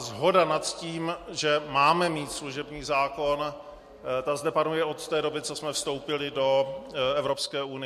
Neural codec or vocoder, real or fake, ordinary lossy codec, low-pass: none; real; MP3, 64 kbps; 14.4 kHz